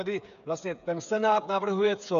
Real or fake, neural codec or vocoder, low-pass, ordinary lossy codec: fake; codec, 16 kHz, 8 kbps, FreqCodec, smaller model; 7.2 kHz; MP3, 96 kbps